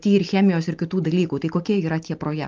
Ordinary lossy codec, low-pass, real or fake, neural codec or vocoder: Opus, 32 kbps; 7.2 kHz; real; none